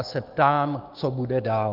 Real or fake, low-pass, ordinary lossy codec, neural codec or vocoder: fake; 5.4 kHz; Opus, 24 kbps; codec, 16 kHz, 6 kbps, DAC